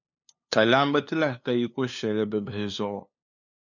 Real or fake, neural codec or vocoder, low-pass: fake; codec, 16 kHz, 2 kbps, FunCodec, trained on LibriTTS, 25 frames a second; 7.2 kHz